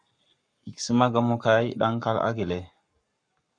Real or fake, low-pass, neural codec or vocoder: fake; 9.9 kHz; codec, 44.1 kHz, 7.8 kbps, Pupu-Codec